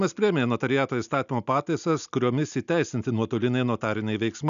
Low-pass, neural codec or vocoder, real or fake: 7.2 kHz; none; real